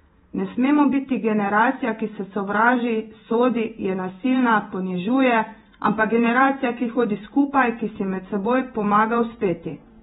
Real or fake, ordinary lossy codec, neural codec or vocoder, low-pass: fake; AAC, 16 kbps; vocoder, 48 kHz, 128 mel bands, Vocos; 19.8 kHz